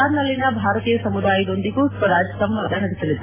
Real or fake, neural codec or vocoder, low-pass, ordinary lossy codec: real; none; 3.6 kHz; AAC, 16 kbps